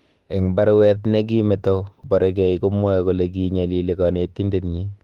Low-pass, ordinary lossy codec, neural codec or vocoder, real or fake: 19.8 kHz; Opus, 24 kbps; autoencoder, 48 kHz, 32 numbers a frame, DAC-VAE, trained on Japanese speech; fake